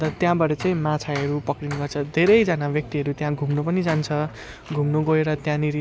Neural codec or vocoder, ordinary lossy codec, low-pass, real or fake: none; none; none; real